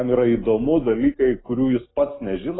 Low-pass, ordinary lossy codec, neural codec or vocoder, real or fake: 7.2 kHz; AAC, 16 kbps; none; real